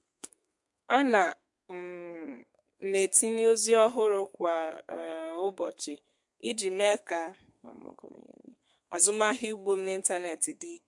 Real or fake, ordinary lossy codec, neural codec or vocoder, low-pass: fake; MP3, 64 kbps; codec, 32 kHz, 1.9 kbps, SNAC; 10.8 kHz